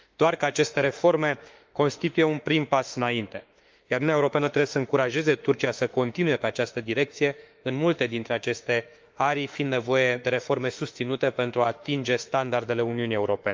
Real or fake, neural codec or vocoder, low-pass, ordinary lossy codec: fake; autoencoder, 48 kHz, 32 numbers a frame, DAC-VAE, trained on Japanese speech; 7.2 kHz; Opus, 32 kbps